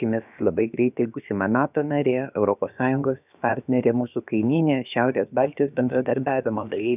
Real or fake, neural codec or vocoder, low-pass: fake; codec, 16 kHz, about 1 kbps, DyCAST, with the encoder's durations; 3.6 kHz